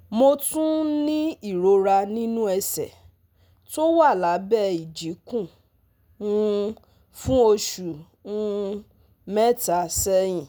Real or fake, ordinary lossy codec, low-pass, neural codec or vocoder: real; none; none; none